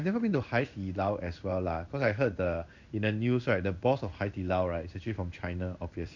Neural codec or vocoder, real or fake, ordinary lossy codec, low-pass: codec, 16 kHz in and 24 kHz out, 1 kbps, XY-Tokenizer; fake; none; 7.2 kHz